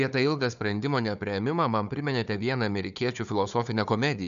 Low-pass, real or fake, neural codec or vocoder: 7.2 kHz; fake; codec, 16 kHz, 4 kbps, FunCodec, trained on Chinese and English, 50 frames a second